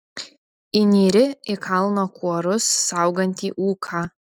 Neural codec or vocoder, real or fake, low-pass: none; real; 19.8 kHz